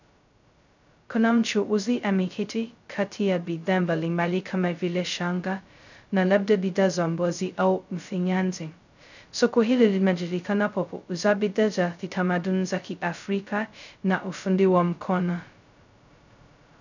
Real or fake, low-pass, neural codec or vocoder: fake; 7.2 kHz; codec, 16 kHz, 0.2 kbps, FocalCodec